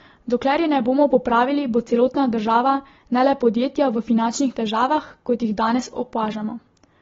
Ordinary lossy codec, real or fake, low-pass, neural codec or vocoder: AAC, 24 kbps; real; 7.2 kHz; none